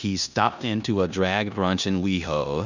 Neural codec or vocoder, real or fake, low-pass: codec, 16 kHz in and 24 kHz out, 0.9 kbps, LongCat-Audio-Codec, four codebook decoder; fake; 7.2 kHz